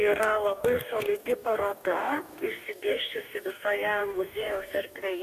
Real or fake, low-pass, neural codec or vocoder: fake; 14.4 kHz; codec, 44.1 kHz, 2.6 kbps, DAC